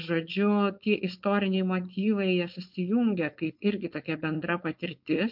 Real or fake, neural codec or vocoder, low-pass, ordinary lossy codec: fake; codec, 16 kHz, 4.8 kbps, FACodec; 5.4 kHz; MP3, 48 kbps